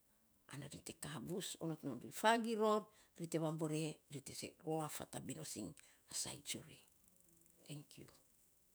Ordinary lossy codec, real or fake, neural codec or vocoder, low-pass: none; fake; autoencoder, 48 kHz, 128 numbers a frame, DAC-VAE, trained on Japanese speech; none